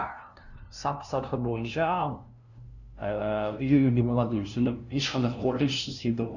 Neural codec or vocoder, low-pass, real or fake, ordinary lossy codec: codec, 16 kHz, 0.5 kbps, FunCodec, trained on LibriTTS, 25 frames a second; 7.2 kHz; fake; none